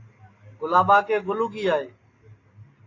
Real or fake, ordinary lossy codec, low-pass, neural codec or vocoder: real; AAC, 32 kbps; 7.2 kHz; none